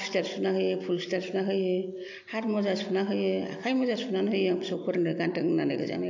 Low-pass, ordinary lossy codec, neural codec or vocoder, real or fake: 7.2 kHz; AAC, 48 kbps; none; real